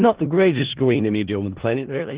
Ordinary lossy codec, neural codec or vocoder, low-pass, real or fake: Opus, 16 kbps; codec, 16 kHz in and 24 kHz out, 0.4 kbps, LongCat-Audio-Codec, four codebook decoder; 3.6 kHz; fake